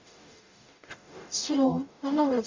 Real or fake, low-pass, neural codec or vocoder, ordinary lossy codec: fake; 7.2 kHz; codec, 44.1 kHz, 0.9 kbps, DAC; MP3, 64 kbps